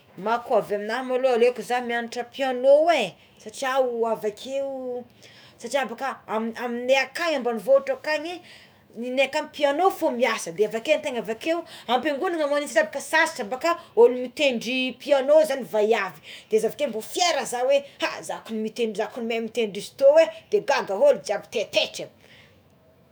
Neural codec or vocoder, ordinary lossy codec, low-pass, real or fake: autoencoder, 48 kHz, 128 numbers a frame, DAC-VAE, trained on Japanese speech; none; none; fake